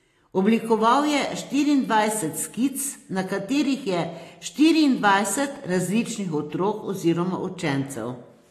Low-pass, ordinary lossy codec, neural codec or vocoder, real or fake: 14.4 kHz; AAC, 48 kbps; none; real